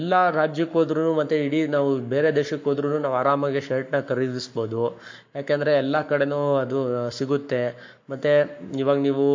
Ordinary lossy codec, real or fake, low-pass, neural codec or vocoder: MP3, 48 kbps; fake; 7.2 kHz; codec, 44.1 kHz, 7.8 kbps, Pupu-Codec